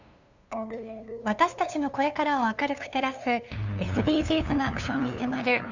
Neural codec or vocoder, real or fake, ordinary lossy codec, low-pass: codec, 16 kHz, 2 kbps, FunCodec, trained on LibriTTS, 25 frames a second; fake; Opus, 64 kbps; 7.2 kHz